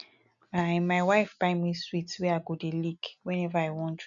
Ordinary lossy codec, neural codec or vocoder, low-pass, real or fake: none; none; 7.2 kHz; real